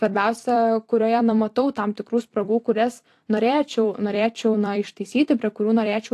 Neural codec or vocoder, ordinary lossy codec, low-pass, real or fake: vocoder, 44.1 kHz, 128 mel bands every 256 samples, BigVGAN v2; AAC, 64 kbps; 14.4 kHz; fake